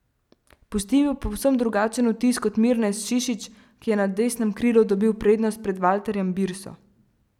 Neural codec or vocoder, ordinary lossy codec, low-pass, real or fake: none; none; 19.8 kHz; real